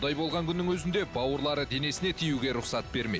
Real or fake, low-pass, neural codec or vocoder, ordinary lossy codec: real; none; none; none